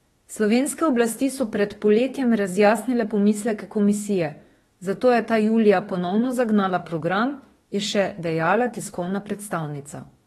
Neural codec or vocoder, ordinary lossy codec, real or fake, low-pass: autoencoder, 48 kHz, 32 numbers a frame, DAC-VAE, trained on Japanese speech; AAC, 32 kbps; fake; 19.8 kHz